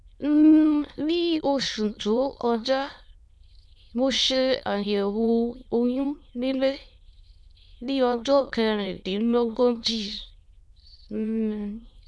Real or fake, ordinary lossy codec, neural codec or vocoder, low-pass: fake; none; autoencoder, 22.05 kHz, a latent of 192 numbers a frame, VITS, trained on many speakers; none